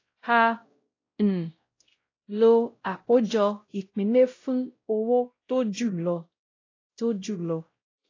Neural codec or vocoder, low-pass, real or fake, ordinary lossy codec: codec, 16 kHz, 0.5 kbps, X-Codec, WavLM features, trained on Multilingual LibriSpeech; 7.2 kHz; fake; AAC, 32 kbps